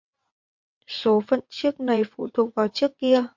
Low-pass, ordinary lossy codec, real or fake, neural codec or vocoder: 7.2 kHz; MP3, 48 kbps; fake; vocoder, 22.05 kHz, 80 mel bands, WaveNeXt